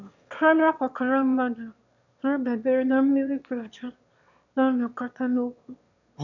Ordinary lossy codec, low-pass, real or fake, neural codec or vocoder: none; 7.2 kHz; fake; autoencoder, 22.05 kHz, a latent of 192 numbers a frame, VITS, trained on one speaker